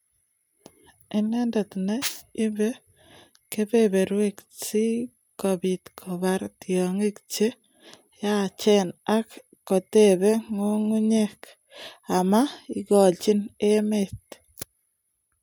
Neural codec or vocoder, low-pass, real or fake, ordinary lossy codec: none; none; real; none